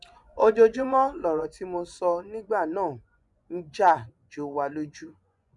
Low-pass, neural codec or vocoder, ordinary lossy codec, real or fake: 10.8 kHz; vocoder, 24 kHz, 100 mel bands, Vocos; none; fake